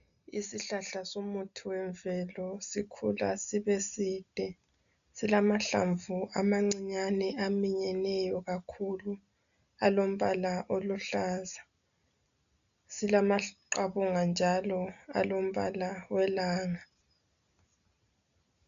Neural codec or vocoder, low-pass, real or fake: none; 7.2 kHz; real